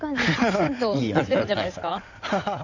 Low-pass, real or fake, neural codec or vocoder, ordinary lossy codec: 7.2 kHz; fake; codec, 16 kHz in and 24 kHz out, 2.2 kbps, FireRedTTS-2 codec; none